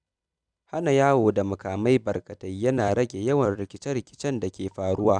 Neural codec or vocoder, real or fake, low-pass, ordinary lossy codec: vocoder, 44.1 kHz, 128 mel bands every 256 samples, BigVGAN v2; fake; 9.9 kHz; none